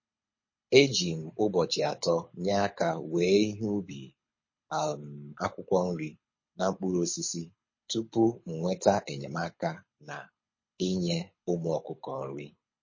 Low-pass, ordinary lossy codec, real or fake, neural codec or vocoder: 7.2 kHz; MP3, 32 kbps; fake; codec, 24 kHz, 6 kbps, HILCodec